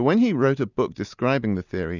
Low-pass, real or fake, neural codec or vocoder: 7.2 kHz; real; none